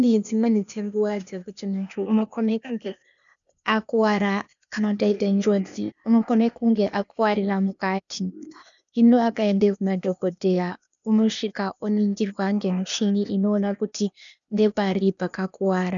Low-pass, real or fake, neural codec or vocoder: 7.2 kHz; fake; codec, 16 kHz, 0.8 kbps, ZipCodec